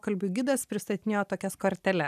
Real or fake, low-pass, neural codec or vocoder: real; 14.4 kHz; none